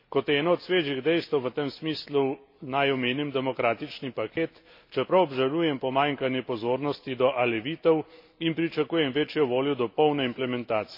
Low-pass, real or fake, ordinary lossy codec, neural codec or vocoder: 5.4 kHz; real; MP3, 32 kbps; none